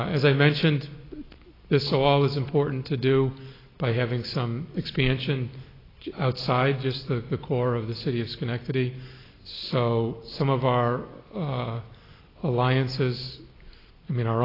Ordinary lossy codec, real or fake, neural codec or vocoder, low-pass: AAC, 24 kbps; real; none; 5.4 kHz